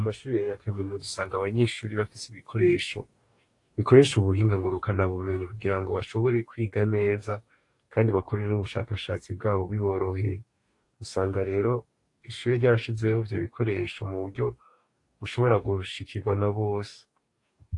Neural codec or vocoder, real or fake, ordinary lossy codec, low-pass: codec, 44.1 kHz, 2.6 kbps, DAC; fake; AAC, 48 kbps; 10.8 kHz